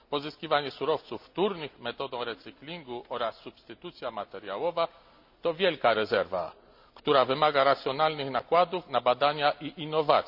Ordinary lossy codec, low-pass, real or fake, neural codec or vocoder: none; 5.4 kHz; real; none